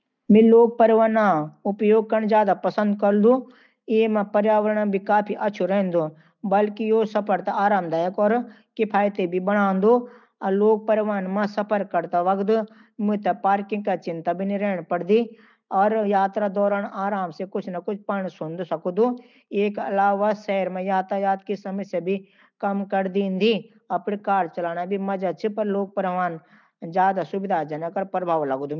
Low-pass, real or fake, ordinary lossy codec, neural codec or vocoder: 7.2 kHz; real; none; none